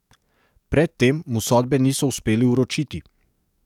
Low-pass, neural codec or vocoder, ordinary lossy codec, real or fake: 19.8 kHz; codec, 44.1 kHz, 7.8 kbps, DAC; none; fake